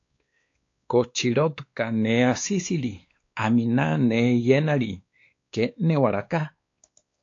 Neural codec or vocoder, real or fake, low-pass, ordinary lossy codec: codec, 16 kHz, 2 kbps, X-Codec, WavLM features, trained on Multilingual LibriSpeech; fake; 7.2 kHz; AAC, 48 kbps